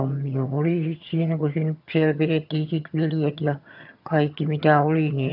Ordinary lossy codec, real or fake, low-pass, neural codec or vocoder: none; fake; 5.4 kHz; vocoder, 22.05 kHz, 80 mel bands, HiFi-GAN